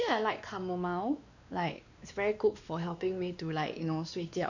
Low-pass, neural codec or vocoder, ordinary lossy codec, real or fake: 7.2 kHz; codec, 16 kHz, 2 kbps, X-Codec, WavLM features, trained on Multilingual LibriSpeech; none; fake